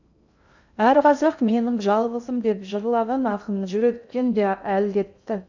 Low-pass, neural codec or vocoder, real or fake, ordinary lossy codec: 7.2 kHz; codec, 16 kHz in and 24 kHz out, 0.6 kbps, FocalCodec, streaming, 2048 codes; fake; none